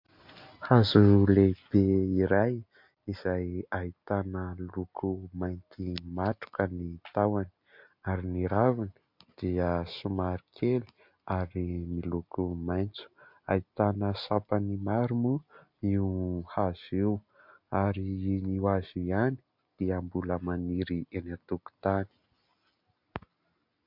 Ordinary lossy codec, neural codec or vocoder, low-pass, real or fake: MP3, 48 kbps; none; 5.4 kHz; real